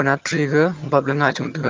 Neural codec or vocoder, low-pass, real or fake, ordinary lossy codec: vocoder, 22.05 kHz, 80 mel bands, HiFi-GAN; 7.2 kHz; fake; Opus, 24 kbps